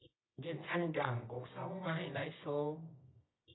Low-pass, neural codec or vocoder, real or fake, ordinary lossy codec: 7.2 kHz; codec, 24 kHz, 0.9 kbps, WavTokenizer, medium music audio release; fake; AAC, 16 kbps